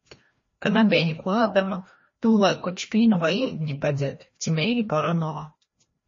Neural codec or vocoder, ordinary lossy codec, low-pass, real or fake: codec, 16 kHz, 1 kbps, FreqCodec, larger model; MP3, 32 kbps; 7.2 kHz; fake